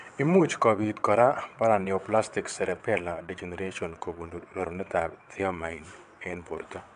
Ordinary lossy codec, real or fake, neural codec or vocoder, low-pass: AAC, 96 kbps; fake; vocoder, 22.05 kHz, 80 mel bands, WaveNeXt; 9.9 kHz